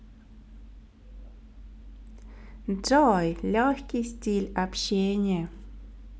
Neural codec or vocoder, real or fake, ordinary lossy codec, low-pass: none; real; none; none